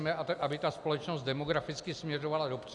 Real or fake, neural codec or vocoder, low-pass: real; none; 10.8 kHz